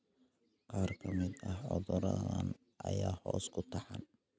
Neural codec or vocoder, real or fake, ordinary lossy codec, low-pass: none; real; none; none